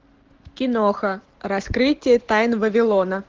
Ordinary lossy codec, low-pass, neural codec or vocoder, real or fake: Opus, 16 kbps; 7.2 kHz; none; real